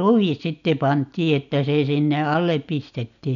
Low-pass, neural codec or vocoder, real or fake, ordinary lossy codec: 7.2 kHz; none; real; none